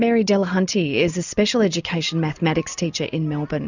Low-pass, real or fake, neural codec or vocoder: 7.2 kHz; real; none